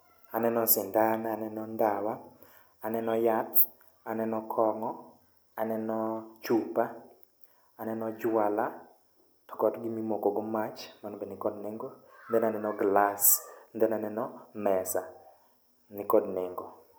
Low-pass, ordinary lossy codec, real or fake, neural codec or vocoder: none; none; real; none